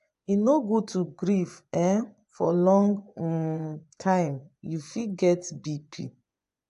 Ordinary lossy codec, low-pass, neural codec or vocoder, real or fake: AAC, 96 kbps; 10.8 kHz; vocoder, 24 kHz, 100 mel bands, Vocos; fake